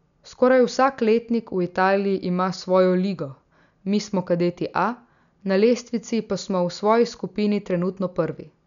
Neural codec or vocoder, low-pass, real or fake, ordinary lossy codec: none; 7.2 kHz; real; none